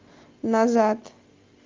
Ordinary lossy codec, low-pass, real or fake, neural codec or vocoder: Opus, 32 kbps; 7.2 kHz; real; none